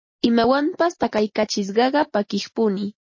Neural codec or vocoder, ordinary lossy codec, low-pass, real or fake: none; MP3, 32 kbps; 7.2 kHz; real